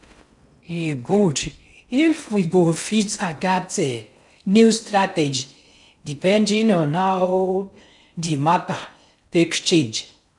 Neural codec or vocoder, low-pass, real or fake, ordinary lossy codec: codec, 16 kHz in and 24 kHz out, 0.6 kbps, FocalCodec, streaming, 4096 codes; 10.8 kHz; fake; none